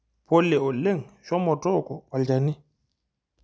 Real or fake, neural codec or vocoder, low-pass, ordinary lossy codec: real; none; none; none